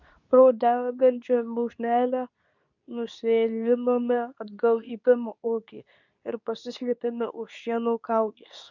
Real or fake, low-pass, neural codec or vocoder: fake; 7.2 kHz; codec, 24 kHz, 0.9 kbps, WavTokenizer, medium speech release version 2